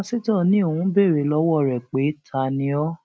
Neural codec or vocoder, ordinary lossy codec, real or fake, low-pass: none; none; real; none